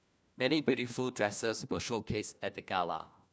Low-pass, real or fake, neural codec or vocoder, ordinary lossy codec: none; fake; codec, 16 kHz, 1 kbps, FunCodec, trained on LibriTTS, 50 frames a second; none